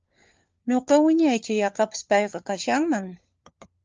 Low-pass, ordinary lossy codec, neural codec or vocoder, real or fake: 7.2 kHz; Opus, 24 kbps; codec, 16 kHz, 4 kbps, FunCodec, trained on LibriTTS, 50 frames a second; fake